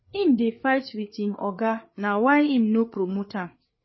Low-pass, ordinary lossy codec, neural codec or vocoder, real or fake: 7.2 kHz; MP3, 24 kbps; codec, 16 kHz, 4 kbps, FreqCodec, larger model; fake